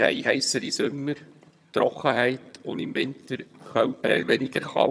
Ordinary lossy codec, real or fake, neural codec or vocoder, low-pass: none; fake; vocoder, 22.05 kHz, 80 mel bands, HiFi-GAN; none